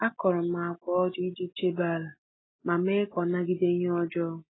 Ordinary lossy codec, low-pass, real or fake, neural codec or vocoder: AAC, 16 kbps; 7.2 kHz; real; none